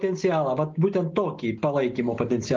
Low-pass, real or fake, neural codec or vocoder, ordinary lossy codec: 7.2 kHz; real; none; Opus, 24 kbps